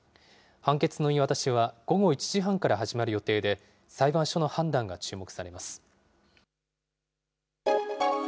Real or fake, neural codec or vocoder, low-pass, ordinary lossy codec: real; none; none; none